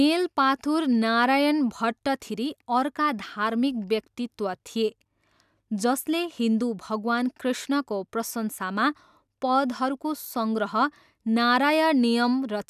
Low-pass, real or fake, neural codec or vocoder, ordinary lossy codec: 14.4 kHz; real; none; none